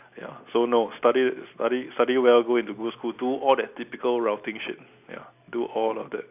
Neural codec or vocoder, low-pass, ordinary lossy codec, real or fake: none; 3.6 kHz; none; real